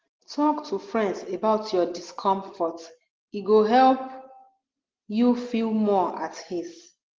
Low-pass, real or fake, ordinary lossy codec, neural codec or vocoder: 7.2 kHz; real; Opus, 16 kbps; none